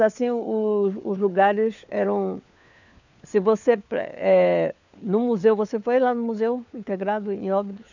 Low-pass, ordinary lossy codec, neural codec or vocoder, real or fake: 7.2 kHz; none; codec, 16 kHz, 4 kbps, FunCodec, trained on LibriTTS, 50 frames a second; fake